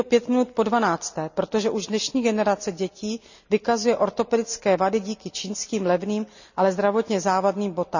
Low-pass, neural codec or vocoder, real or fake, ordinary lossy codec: 7.2 kHz; none; real; none